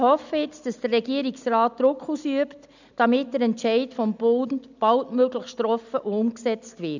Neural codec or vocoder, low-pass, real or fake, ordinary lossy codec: none; 7.2 kHz; real; none